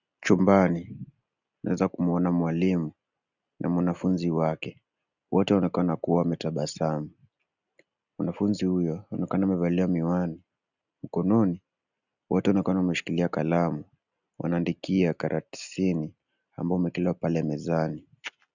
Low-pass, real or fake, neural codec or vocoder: 7.2 kHz; real; none